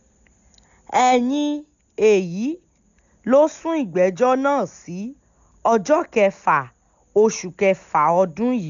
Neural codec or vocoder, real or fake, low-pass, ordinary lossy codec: none; real; 7.2 kHz; none